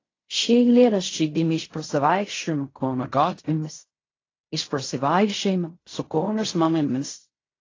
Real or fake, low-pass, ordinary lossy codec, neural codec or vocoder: fake; 7.2 kHz; AAC, 32 kbps; codec, 16 kHz in and 24 kHz out, 0.4 kbps, LongCat-Audio-Codec, fine tuned four codebook decoder